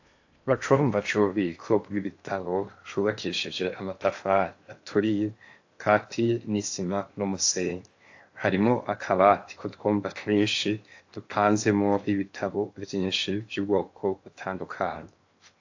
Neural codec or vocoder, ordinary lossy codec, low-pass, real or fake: codec, 16 kHz in and 24 kHz out, 0.8 kbps, FocalCodec, streaming, 65536 codes; AAC, 48 kbps; 7.2 kHz; fake